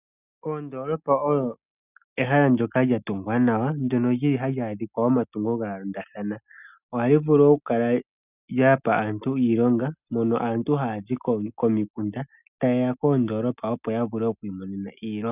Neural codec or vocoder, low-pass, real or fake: none; 3.6 kHz; real